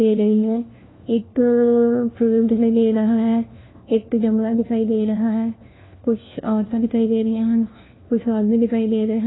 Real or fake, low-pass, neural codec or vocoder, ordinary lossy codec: fake; 7.2 kHz; codec, 16 kHz, 1 kbps, FunCodec, trained on LibriTTS, 50 frames a second; AAC, 16 kbps